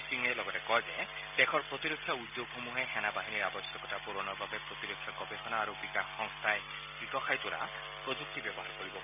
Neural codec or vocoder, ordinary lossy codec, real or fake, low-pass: none; none; real; 3.6 kHz